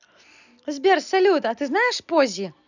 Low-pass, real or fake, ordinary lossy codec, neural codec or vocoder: 7.2 kHz; real; none; none